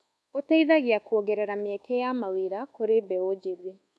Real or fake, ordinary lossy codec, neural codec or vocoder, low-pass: fake; none; codec, 24 kHz, 1.2 kbps, DualCodec; none